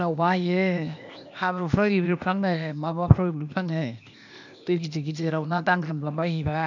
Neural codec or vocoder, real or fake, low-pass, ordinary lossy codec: codec, 16 kHz, 0.8 kbps, ZipCodec; fake; 7.2 kHz; none